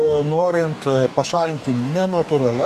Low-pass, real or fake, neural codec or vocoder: 14.4 kHz; fake; codec, 44.1 kHz, 2.6 kbps, DAC